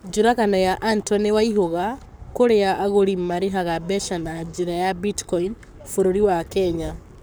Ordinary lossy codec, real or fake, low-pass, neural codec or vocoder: none; fake; none; codec, 44.1 kHz, 7.8 kbps, Pupu-Codec